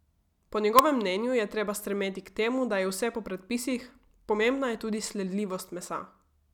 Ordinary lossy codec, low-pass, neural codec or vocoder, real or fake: none; 19.8 kHz; none; real